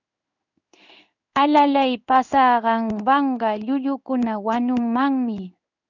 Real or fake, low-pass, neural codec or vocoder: fake; 7.2 kHz; codec, 16 kHz in and 24 kHz out, 1 kbps, XY-Tokenizer